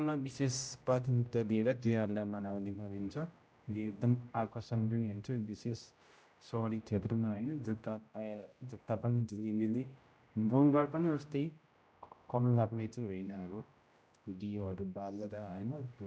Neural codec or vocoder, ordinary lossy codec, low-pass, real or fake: codec, 16 kHz, 0.5 kbps, X-Codec, HuBERT features, trained on general audio; none; none; fake